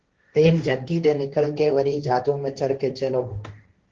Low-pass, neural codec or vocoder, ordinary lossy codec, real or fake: 7.2 kHz; codec, 16 kHz, 1.1 kbps, Voila-Tokenizer; Opus, 16 kbps; fake